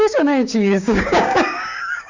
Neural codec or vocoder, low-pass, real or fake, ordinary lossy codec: codec, 16 kHz, 6 kbps, DAC; 7.2 kHz; fake; Opus, 64 kbps